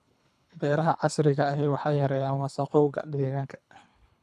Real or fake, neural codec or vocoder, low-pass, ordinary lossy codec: fake; codec, 24 kHz, 3 kbps, HILCodec; none; none